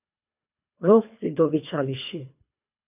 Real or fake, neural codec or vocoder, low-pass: fake; codec, 24 kHz, 3 kbps, HILCodec; 3.6 kHz